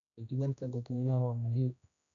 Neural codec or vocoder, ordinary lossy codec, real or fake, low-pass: codec, 16 kHz, 1 kbps, X-Codec, HuBERT features, trained on general audio; none; fake; 7.2 kHz